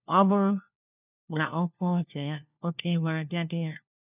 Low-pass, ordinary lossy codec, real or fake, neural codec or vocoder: 3.6 kHz; none; fake; codec, 16 kHz, 1 kbps, FunCodec, trained on LibriTTS, 50 frames a second